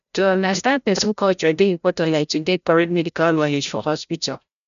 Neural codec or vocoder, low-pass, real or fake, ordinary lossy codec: codec, 16 kHz, 0.5 kbps, FreqCodec, larger model; 7.2 kHz; fake; none